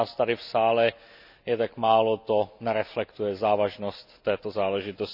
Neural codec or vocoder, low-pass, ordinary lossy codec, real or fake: none; 5.4 kHz; none; real